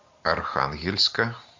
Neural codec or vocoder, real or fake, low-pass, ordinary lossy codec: none; real; 7.2 kHz; MP3, 48 kbps